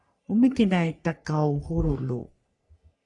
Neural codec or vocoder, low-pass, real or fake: codec, 44.1 kHz, 3.4 kbps, Pupu-Codec; 10.8 kHz; fake